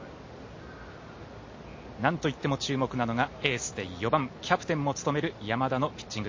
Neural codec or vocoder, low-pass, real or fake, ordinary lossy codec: none; 7.2 kHz; real; MP3, 32 kbps